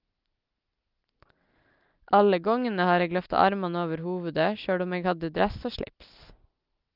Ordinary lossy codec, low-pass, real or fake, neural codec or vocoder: Opus, 24 kbps; 5.4 kHz; real; none